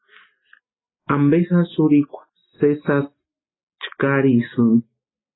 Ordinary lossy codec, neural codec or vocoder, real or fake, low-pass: AAC, 16 kbps; none; real; 7.2 kHz